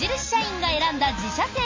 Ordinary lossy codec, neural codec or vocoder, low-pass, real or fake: MP3, 32 kbps; none; 7.2 kHz; real